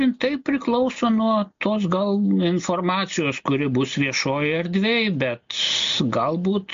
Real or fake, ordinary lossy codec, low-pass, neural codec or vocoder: real; MP3, 48 kbps; 7.2 kHz; none